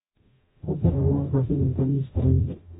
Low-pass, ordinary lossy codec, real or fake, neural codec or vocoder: 19.8 kHz; AAC, 16 kbps; fake; codec, 44.1 kHz, 0.9 kbps, DAC